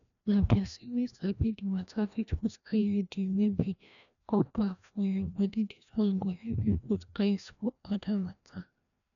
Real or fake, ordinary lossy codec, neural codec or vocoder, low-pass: fake; none; codec, 16 kHz, 1 kbps, FreqCodec, larger model; 7.2 kHz